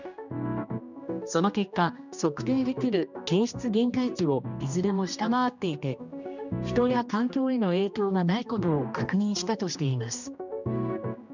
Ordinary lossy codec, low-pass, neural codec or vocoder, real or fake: none; 7.2 kHz; codec, 16 kHz, 1 kbps, X-Codec, HuBERT features, trained on general audio; fake